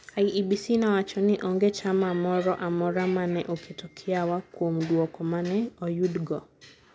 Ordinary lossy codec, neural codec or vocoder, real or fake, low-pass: none; none; real; none